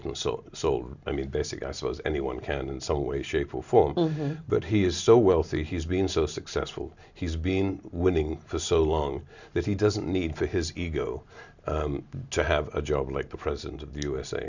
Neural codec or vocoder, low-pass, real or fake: none; 7.2 kHz; real